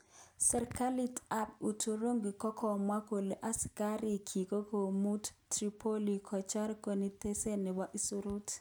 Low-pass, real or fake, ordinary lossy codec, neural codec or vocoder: none; real; none; none